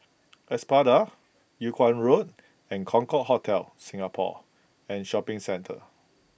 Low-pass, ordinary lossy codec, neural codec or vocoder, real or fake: none; none; none; real